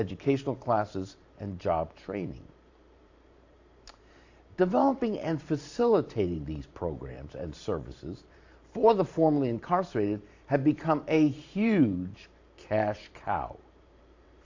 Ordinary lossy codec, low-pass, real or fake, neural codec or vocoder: AAC, 48 kbps; 7.2 kHz; fake; vocoder, 44.1 kHz, 128 mel bands every 512 samples, BigVGAN v2